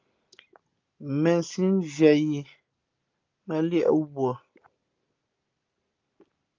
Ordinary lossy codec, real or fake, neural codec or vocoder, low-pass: Opus, 24 kbps; real; none; 7.2 kHz